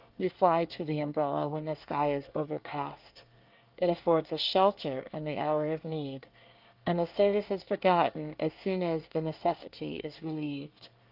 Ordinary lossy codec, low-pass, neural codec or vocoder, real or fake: Opus, 24 kbps; 5.4 kHz; codec, 24 kHz, 1 kbps, SNAC; fake